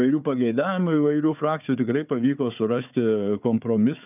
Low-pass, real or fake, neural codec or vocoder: 3.6 kHz; fake; codec, 16 kHz, 4 kbps, FunCodec, trained on Chinese and English, 50 frames a second